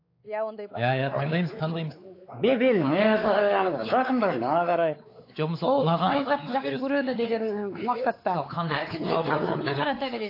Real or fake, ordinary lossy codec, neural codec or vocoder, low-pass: fake; AAC, 32 kbps; codec, 16 kHz, 4 kbps, X-Codec, WavLM features, trained on Multilingual LibriSpeech; 5.4 kHz